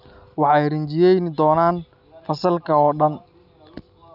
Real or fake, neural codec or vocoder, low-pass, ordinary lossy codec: real; none; 5.4 kHz; none